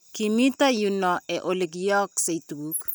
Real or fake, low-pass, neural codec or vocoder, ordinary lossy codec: real; none; none; none